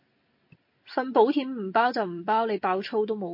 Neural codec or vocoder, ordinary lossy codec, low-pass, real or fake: none; MP3, 32 kbps; 5.4 kHz; real